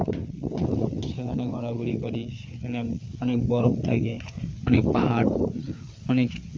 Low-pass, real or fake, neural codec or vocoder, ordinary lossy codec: none; fake; codec, 16 kHz, 4 kbps, FreqCodec, larger model; none